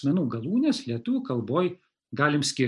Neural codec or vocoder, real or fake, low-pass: none; real; 10.8 kHz